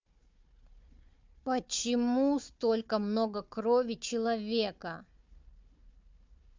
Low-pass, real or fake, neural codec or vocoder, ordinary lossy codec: 7.2 kHz; fake; codec, 16 kHz, 4 kbps, FunCodec, trained on Chinese and English, 50 frames a second; MP3, 64 kbps